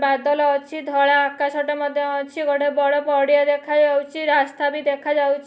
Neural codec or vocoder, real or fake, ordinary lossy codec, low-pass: none; real; none; none